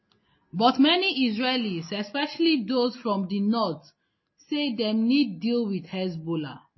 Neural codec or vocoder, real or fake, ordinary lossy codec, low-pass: none; real; MP3, 24 kbps; 7.2 kHz